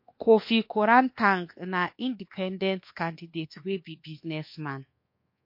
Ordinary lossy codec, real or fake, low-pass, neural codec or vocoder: MP3, 32 kbps; fake; 5.4 kHz; codec, 24 kHz, 1.2 kbps, DualCodec